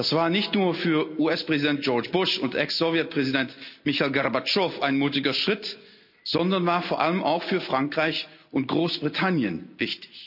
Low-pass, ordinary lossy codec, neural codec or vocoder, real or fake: 5.4 kHz; none; none; real